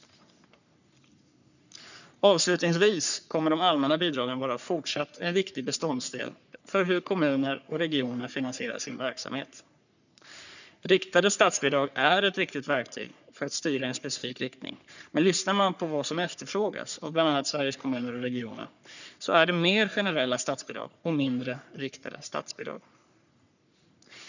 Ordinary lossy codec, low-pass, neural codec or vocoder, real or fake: none; 7.2 kHz; codec, 44.1 kHz, 3.4 kbps, Pupu-Codec; fake